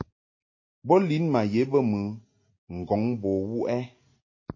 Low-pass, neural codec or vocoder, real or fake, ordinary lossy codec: 7.2 kHz; none; real; MP3, 32 kbps